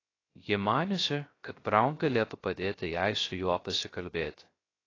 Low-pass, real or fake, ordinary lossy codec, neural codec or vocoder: 7.2 kHz; fake; AAC, 32 kbps; codec, 16 kHz, 0.3 kbps, FocalCodec